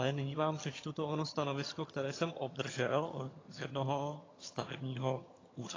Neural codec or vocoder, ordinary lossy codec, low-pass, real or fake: vocoder, 22.05 kHz, 80 mel bands, HiFi-GAN; AAC, 32 kbps; 7.2 kHz; fake